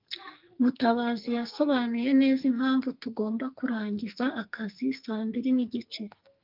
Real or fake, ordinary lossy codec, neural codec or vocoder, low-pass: fake; Opus, 24 kbps; codec, 44.1 kHz, 2.6 kbps, SNAC; 5.4 kHz